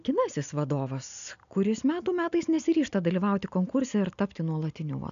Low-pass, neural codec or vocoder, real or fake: 7.2 kHz; none; real